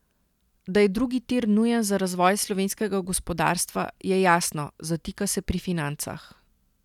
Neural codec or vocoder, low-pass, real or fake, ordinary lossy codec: none; 19.8 kHz; real; none